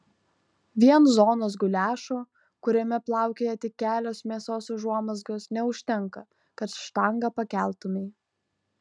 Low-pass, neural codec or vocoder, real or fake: 9.9 kHz; none; real